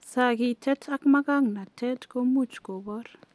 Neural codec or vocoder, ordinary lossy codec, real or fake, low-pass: none; none; real; none